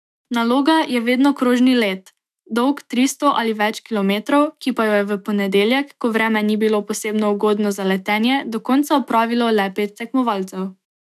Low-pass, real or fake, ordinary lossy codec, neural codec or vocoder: 14.4 kHz; fake; none; autoencoder, 48 kHz, 128 numbers a frame, DAC-VAE, trained on Japanese speech